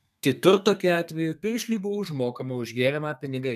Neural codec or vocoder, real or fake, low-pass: codec, 32 kHz, 1.9 kbps, SNAC; fake; 14.4 kHz